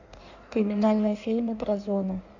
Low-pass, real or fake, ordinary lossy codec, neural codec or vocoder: 7.2 kHz; fake; none; codec, 16 kHz in and 24 kHz out, 1.1 kbps, FireRedTTS-2 codec